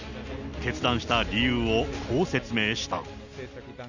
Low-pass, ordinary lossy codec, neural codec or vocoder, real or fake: 7.2 kHz; none; none; real